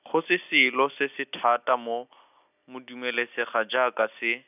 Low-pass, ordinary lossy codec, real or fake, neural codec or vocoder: 3.6 kHz; none; real; none